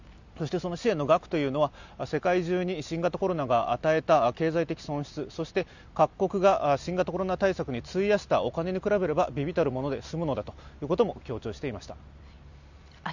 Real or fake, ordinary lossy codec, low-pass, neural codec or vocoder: real; none; 7.2 kHz; none